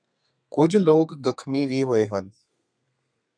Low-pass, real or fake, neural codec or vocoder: 9.9 kHz; fake; codec, 32 kHz, 1.9 kbps, SNAC